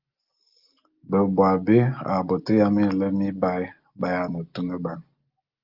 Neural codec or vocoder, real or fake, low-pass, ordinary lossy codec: none; real; 5.4 kHz; Opus, 32 kbps